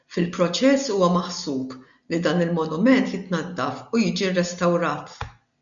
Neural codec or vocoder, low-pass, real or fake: none; 7.2 kHz; real